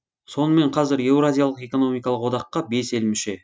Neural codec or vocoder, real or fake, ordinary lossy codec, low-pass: none; real; none; none